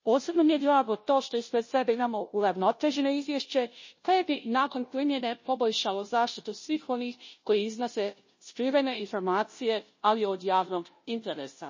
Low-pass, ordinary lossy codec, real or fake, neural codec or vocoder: 7.2 kHz; MP3, 32 kbps; fake; codec, 16 kHz, 0.5 kbps, FunCodec, trained on Chinese and English, 25 frames a second